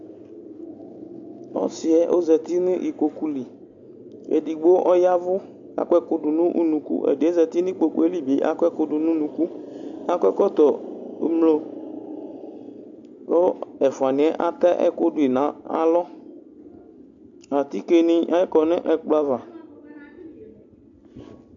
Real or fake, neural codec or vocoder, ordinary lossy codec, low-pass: real; none; AAC, 64 kbps; 7.2 kHz